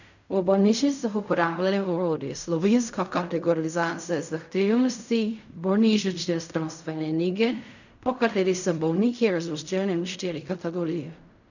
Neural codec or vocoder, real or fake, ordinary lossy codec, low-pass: codec, 16 kHz in and 24 kHz out, 0.4 kbps, LongCat-Audio-Codec, fine tuned four codebook decoder; fake; none; 7.2 kHz